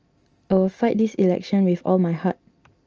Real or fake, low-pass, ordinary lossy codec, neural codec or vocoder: real; 7.2 kHz; Opus, 24 kbps; none